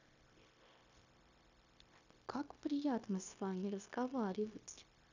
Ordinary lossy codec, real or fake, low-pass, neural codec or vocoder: none; fake; 7.2 kHz; codec, 16 kHz, 0.9 kbps, LongCat-Audio-Codec